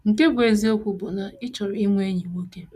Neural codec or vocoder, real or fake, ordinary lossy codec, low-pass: none; real; none; 14.4 kHz